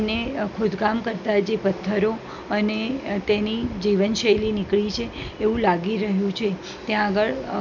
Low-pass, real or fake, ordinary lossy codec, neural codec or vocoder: 7.2 kHz; real; none; none